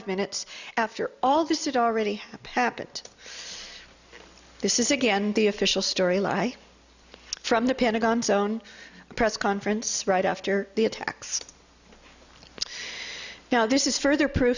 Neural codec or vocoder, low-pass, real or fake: none; 7.2 kHz; real